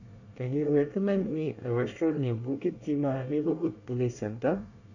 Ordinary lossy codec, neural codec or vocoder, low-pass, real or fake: none; codec, 24 kHz, 1 kbps, SNAC; 7.2 kHz; fake